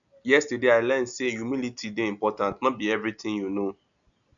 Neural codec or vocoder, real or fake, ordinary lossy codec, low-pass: none; real; none; 7.2 kHz